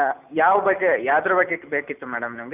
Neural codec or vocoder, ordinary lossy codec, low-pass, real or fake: none; none; 3.6 kHz; real